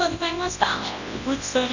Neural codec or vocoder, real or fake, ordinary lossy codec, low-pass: codec, 24 kHz, 0.9 kbps, WavTokenizer, large speech release; fake; none; 7.2 kHz